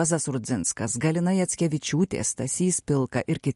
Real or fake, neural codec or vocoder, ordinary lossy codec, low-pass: real; none; MP3, 48 kbps; 14.4 kHz